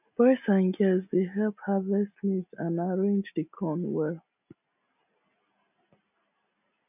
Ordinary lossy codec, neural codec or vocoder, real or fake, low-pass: none; none; real; 3.6 kHz